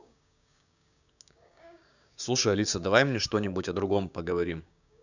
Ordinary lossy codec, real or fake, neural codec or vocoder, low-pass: none; fake; codec, 16 kHz, 6 kbps, DAC; 7.2 kHz